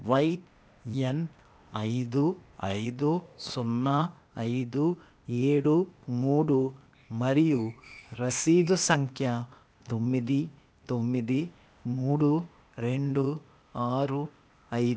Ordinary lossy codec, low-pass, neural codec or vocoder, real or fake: none; none; codec, 16 kHz, 0.8 kbps, ZipCodec; fake